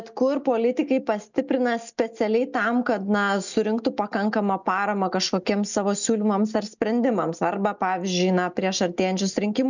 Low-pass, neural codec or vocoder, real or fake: 7.2 kHz; none; real